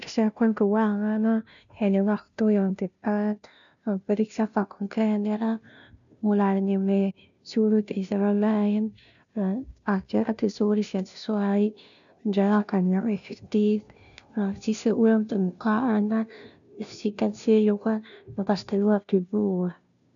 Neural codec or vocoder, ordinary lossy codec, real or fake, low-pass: codec, 16 kHz, 0.5 kbps, FunCodec, trained on Chinese and English, 25 frames a second; none; fake; 7.2 kHz